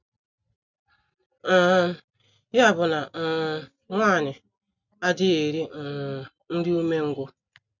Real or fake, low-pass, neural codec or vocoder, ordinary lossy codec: real; 7.2 kHz; none; none